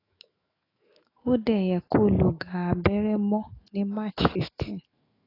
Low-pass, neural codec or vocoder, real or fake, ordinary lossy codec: 5.4 kHz; autoencoder, 48 kHz, 128 numbers a frame, DAC-VAE, trained on Japanese speech; fake; AAC, 24 kbps